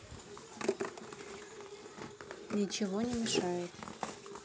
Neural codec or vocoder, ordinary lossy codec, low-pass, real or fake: none; none; none; real